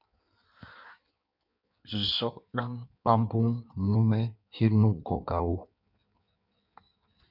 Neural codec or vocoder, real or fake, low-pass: codec, 16 kHz in and 24 kHz out, 1.1 kbps, FireRedTTS-2 codec; fake; 5.4 kHz